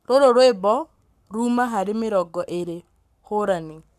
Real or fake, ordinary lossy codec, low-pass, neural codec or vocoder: fake; none; 14.4 kHz; codec, 44.1 kHz, 7.8 kbps, Pupu-Codec